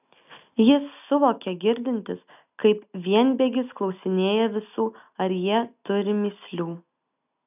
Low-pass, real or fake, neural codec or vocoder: 3.6 kHz; real; none